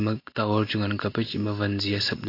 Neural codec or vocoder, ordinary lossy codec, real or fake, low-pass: none; AAC, 32 kbps; real; 5.4 kHz